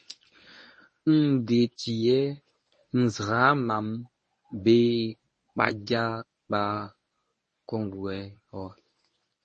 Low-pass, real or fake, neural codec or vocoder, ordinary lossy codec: 10.8 kHz; fake; codec, 24 kHz, 0.9 kbps, WavTokenizer, medium speech release version 1; MP3, 32 kbps